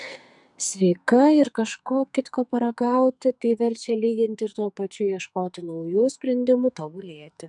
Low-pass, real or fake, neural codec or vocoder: 10.8 kHz; fake; codec, 44.1 kHz, 2.6 kbps, SNAC